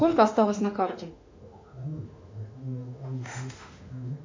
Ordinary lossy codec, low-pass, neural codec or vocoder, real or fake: MP3, 64 kbps; 7.2 kHz; autoencoder, 48 kHz, 32 numbers a frame, DAC-VAE, trained on Japanese speech; fake